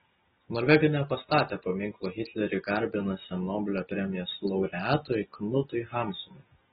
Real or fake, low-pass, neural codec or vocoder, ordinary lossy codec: real; 10.8 kHz; none; AAC, 16 kbps